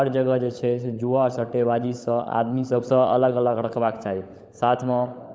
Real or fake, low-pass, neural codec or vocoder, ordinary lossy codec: fake; none; codec, 16 kHz, 16 kbps, FunCodec, trained on LibriTTS, 50 frames a second; none